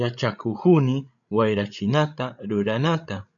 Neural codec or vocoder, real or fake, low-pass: codec, 16 kHz, 8 kbps, FreqCodec, larger model; fake; 7.2 kHz